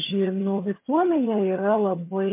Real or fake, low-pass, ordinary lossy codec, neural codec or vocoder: fake; 3.6 kHz; MP3, 24 kbps; vocoder, 22.05 kHz, 80 mel bands, HiFi-GAN